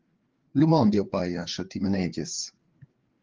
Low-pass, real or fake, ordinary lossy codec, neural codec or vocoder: 7.2 kHz; fake; Opus, 16 kbps; codec, 16 kHz, 4 kbps, FreqCodec, larger model